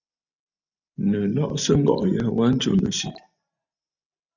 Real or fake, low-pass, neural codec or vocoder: real; 7.2 kHz; none